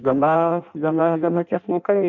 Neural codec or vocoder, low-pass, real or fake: codec, 16 kHz in and 24 kHz out, 0.6 kbps, FireRedTTS-2 codec; 7.2 kHz; fake